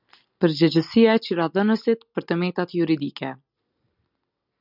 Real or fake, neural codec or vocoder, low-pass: real; none; 5.4 kHz